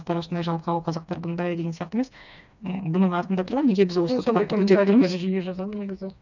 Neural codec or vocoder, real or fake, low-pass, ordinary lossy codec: codec, 16 kHz, 2 kbps, FreqCodec, smaller model; fake; 7.2 kHz; none